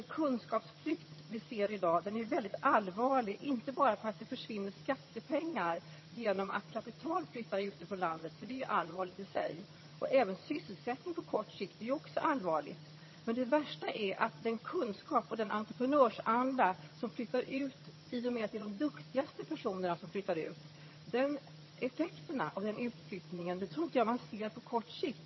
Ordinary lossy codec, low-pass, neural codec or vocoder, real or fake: MP3, 24 kbps; 7.2 kHz; vocoder, 22.05 kHz, 80 mel bands, HiFi-GAN; fake